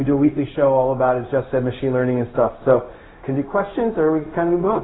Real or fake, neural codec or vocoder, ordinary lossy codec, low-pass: fake; codec, 16 kHz, 0.4 kbps, LongCat-Audio-Codec; AAC, 16 kbps; 7.2 kHz